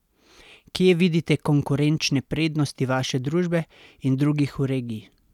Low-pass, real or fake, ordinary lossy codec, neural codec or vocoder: 19.8 kHz; real; none; none